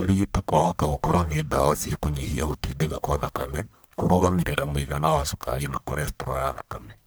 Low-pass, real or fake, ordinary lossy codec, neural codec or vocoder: none; fake; none; codec, 44.1 kHz, 1.7 kbps, Pupu-Codec